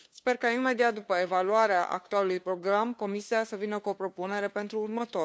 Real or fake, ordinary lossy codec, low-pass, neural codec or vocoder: fake; none; none; codec, 16 kHz, 2 kbps, FunCodec, trained on LibriTTS, 25 frames a second